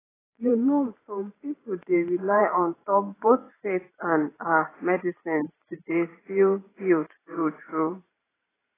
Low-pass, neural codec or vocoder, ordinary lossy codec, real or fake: 3.6 kHz; vocoder, 44.1 kHz, 128 mel bands every 512 samples, BigVGAN v2; AAC, 16 kbps; fake